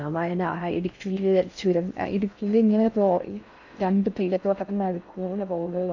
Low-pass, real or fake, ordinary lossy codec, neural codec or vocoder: 7.2 kHz; fake; none; codec, 16 kHz in and 24 kHz out, 0.6 kbps, FocalCodec, streaming, 4096 codes